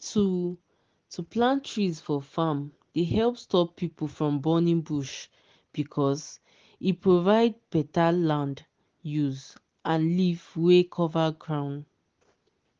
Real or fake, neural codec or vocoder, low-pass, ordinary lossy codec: real; none; 7.2 kHz; Opus, 16 kbps